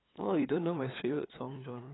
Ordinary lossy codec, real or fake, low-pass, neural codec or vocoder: AAC, 16 kbps; fake; 7.2 kHz; codec, 16 kHz, 8 kbps, FunCodec, trained on LibriTTS, 25 frames a second